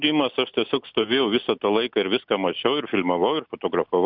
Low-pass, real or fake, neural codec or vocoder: 5.4 kHz; real; none